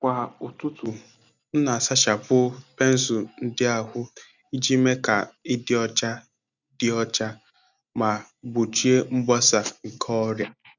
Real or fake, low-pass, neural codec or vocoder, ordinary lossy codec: real; 7.2 kHz; none; none